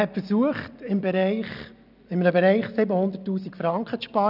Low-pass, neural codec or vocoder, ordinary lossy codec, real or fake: 5.4 kHz; none; none; real